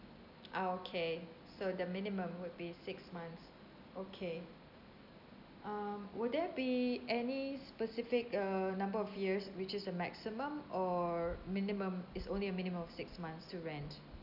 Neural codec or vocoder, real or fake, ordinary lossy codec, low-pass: none; real; none; 5.4 kHz